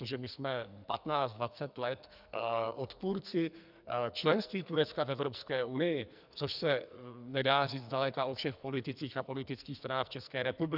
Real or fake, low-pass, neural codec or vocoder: fake; 5.4 kHz; codec, 44.1 kHz, 2.6 kbps, SNAC